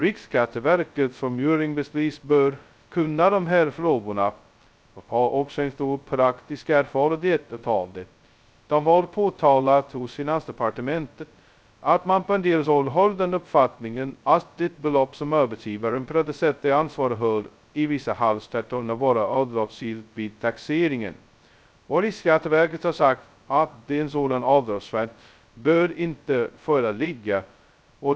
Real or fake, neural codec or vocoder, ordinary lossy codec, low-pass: fake; codec, 16 kHz, 0.2 kbps, FocalCodec; none; none